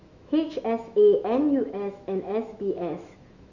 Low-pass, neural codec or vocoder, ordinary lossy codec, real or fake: 7.2 kHz; autoencoder, 48 kHz, 128 numbers a frame, DAC-VAE, trained on Japanese speech; none; fake